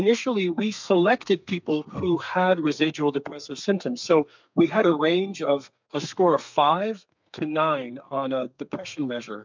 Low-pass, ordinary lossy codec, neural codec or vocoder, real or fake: 7.2 kHz; MP3, 64 kbps; codec, 32 kHz, 1.9 kbps, SNAC; fake